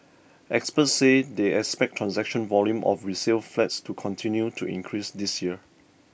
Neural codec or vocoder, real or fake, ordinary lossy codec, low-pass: none; real; none; none